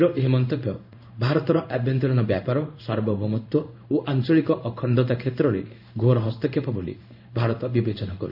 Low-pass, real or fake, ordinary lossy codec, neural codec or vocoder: 5.4 kHz; fake; none; codec, 16 kHz in and 24 kHz out, 1 kbps, XY-Tokenizer